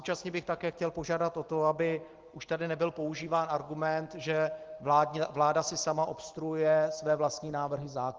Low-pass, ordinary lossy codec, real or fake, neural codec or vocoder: 7.2 kHz; Opus, 16 kbps; real; none